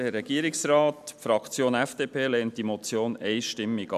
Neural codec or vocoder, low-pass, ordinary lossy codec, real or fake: none; 14.4 kHz; none; real